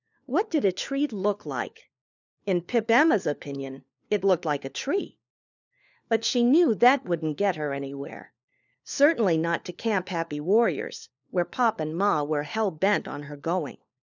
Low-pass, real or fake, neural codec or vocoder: 7.2 kHz; fake; codec, 16 kHz, 4 kbps, FunCodec, trained on LibriTTS, 50 frames a second